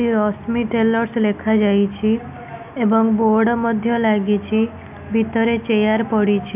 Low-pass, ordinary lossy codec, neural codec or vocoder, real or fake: 3.6 kHz; none; none; real